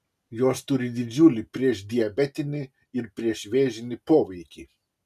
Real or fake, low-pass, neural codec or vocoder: real; 14.4 kHz; none